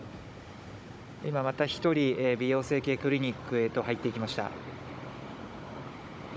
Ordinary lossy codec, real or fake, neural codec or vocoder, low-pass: none; fake; codec, 16 kHz, 16 kbps, FunCodec, trained on Chinese and English, 50 frames a second; none